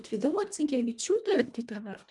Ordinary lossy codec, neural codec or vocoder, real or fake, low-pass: MP3, 96 kbps; codec, 24 kHz, 1.5 kbps, HILCodec; fake; 10.8 kHz